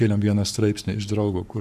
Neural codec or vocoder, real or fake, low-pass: codec, 44.1 kHz, 7.8 kbps, Pupu-Codec; fake; 14.4 kHz